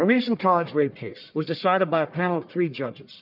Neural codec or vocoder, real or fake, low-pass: codec, 44.1 kHz, 1.7 kbps, Pupu-Codec; fake; 5.4 kHz